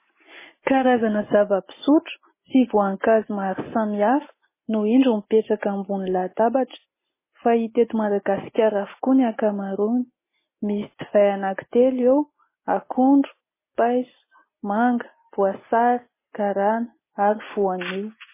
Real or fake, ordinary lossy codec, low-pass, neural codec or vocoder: real; MP3, 16 kbps; 3.6 kHz; none